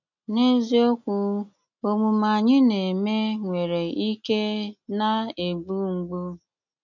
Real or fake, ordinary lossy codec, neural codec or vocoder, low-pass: real; none; none; 7.2 kHz